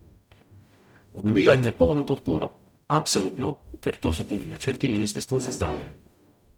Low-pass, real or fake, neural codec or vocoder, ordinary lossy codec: 19.8 kHz; fake; codec, 44.1 kHz, 0.9 kbps, DAC; none